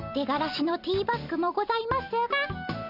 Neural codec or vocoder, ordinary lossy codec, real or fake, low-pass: none; none; real; 5.4 kHz